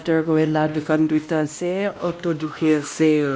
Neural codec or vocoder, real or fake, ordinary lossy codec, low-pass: codec, 16 kHz, 1 kbps, X-Codec, WavLM features, trained on Multilingual LibriSpeech; fake; none; none